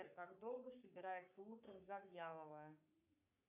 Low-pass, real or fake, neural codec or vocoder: 3.6 kHz; fake; codec, 44.1 kHz, 3.4 kbps, Pupu-Codec